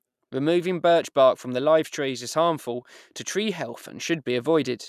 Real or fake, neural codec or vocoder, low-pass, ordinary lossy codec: fake; vocoder, 44.1 kHz, 128 mel bands every 256 samples, BigVGAN v2; 14.4 kHz; none